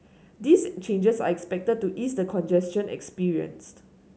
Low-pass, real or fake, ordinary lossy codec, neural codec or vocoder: none; real; none; none